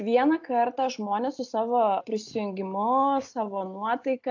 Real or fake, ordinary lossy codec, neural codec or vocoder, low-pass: real; AAC, 48 kbps; none; 7.2 kHz